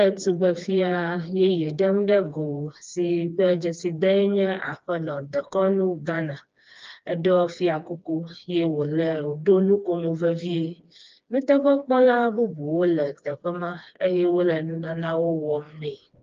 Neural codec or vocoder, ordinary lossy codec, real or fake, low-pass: codec, 16 kHz, 2 kbps, FreqCodec, smaller model; Opus, 24 kbps; fake; 7.2 kHz